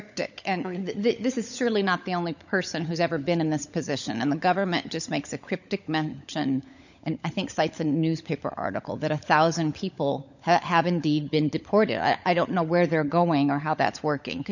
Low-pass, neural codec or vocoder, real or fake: 7.2 kHz; codec, 16 kHz, 16 kbps, FunCodec, trained on LibriTTS, 50 frames a second; fake